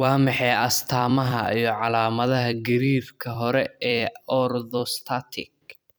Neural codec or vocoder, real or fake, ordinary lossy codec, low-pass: vocoder, 44.1 kHz, 128 mel bands every 256 samples, BigVGAN v2; fake; none; none